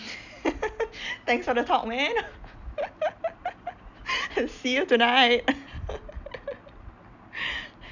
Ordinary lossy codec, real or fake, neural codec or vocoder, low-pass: none; real; none; 7.2 kHz